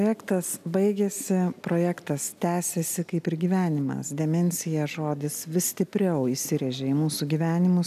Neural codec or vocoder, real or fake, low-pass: none; real; 14.4 kHz